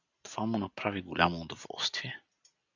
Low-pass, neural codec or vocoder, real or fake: 7.2 kHz; none; real